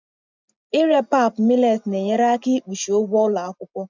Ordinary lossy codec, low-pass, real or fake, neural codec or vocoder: none; 7.2 kHz; real; none